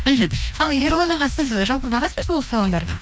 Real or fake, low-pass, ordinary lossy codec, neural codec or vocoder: fake; none; none; codec, 16 kHz, 1 kbps, FreqCodec, larger model